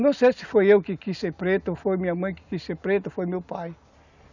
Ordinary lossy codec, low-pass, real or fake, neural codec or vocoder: none; 7.2 kHz; real; none